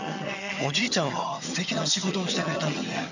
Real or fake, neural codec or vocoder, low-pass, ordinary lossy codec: fake; vocoder, 22.05 kHz, 80 mel bands, HiFi-GAN; 7.2 kHz; none